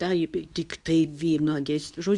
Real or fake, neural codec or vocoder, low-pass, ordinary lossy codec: fake; codec, 24 kHz, 0.9 kbps, WavTokenizer, medium speech release version 2; 10.8 kHz; Opus, 64 kbps